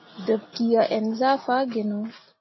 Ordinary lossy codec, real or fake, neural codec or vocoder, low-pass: MP3, 24 kbps; real; none; 7.2 kHz